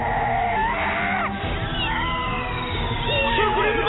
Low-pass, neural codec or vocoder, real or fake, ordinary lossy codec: 7.2 kHz; none; real; AAC, 16 kbps